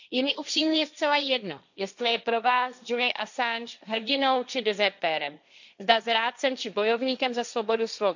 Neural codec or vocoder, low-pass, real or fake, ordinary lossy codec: codec, 16 kHz, 1.1 kbps, Voila-Tokenizer; 7.2 kHz; fake; none